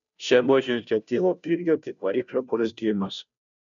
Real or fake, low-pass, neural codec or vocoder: fake; 7.2 kHz; codec, 16 kHz, 0.5 kbps, FunCodec, trained on Chinese and English, 25 frames a second